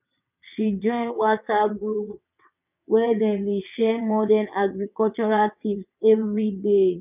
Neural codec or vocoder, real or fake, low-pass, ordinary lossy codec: vocoder, 22.05 kHz, 80 mel bands, WaveNeXt; fake; 3.6 kHz; none